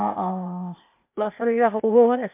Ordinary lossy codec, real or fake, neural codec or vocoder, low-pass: MP3, 32 kbps; fake; codec, 16 kHz, 0.8 kbps, ZipCodec; 3.6 kHz